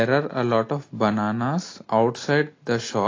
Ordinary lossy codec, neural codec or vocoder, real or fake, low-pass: AAC, 32 kbps; none; real; 7.2 kHz